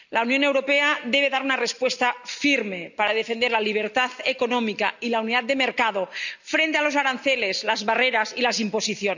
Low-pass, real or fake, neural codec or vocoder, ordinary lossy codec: 7.2 kHz; real; none; none